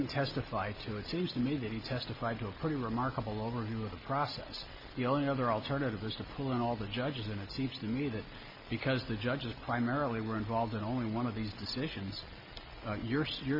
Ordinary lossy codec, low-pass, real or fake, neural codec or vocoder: MP3, 32 kbps; 5.4 kHz; real; none